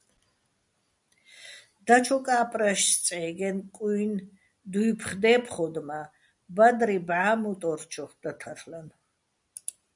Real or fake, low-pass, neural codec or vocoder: real; 10.8 kHz; none